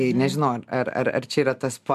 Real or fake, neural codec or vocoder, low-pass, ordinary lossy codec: real; none; 14.4 kHz; MP3, 96 kbps